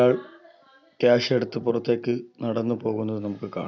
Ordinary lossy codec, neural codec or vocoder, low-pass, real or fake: none; none; 7.2 kHz; real